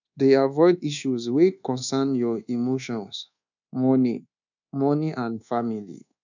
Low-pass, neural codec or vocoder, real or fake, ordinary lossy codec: 7.2 kHz; codec, 24 kHz, 1.2 kbps, DualCodec; fake; none